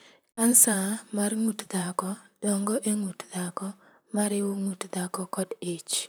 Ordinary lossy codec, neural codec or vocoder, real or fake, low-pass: none; vocoder, 44.1 kHz, 128 mel bands, Pupu-Vocoder; fake; none